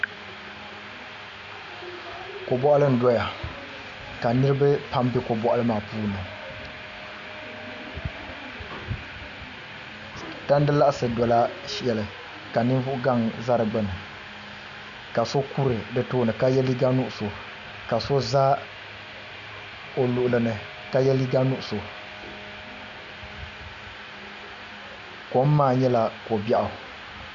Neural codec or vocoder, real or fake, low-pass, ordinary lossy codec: none; real; 7.2 kHz; Opus, 64 kbps